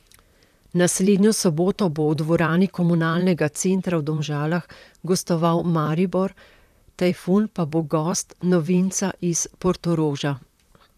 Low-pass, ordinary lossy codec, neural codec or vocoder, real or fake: 14.4 kHz; none; vocoder, 44.1 kHz, 128 mel bands, Pupu-Vocoder; fake